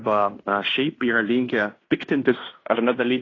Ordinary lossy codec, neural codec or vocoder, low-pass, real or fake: AAC, 32 kbps; codec, 16 kHz in and 24 kHz out, 0.9 kbps, LongCat-Audio-Codec, fine tuned four codebook decoder; 7.2 kHz; fake